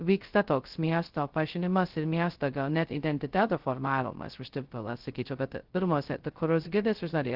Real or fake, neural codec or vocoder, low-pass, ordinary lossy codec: fake; codec, 16 kHz, 0.2 kbps, FocalCodec; 5.4 kHz; Opus, 16 kbps